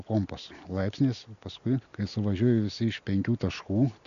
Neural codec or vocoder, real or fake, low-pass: none; real; 7.2 kHz